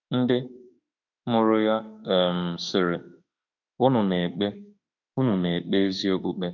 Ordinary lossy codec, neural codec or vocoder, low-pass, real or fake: none; autoencoder, 48 kHz, 32 numbers a frame, DAC-VAE, trained on Japanese speech; 7.2 kHz; fake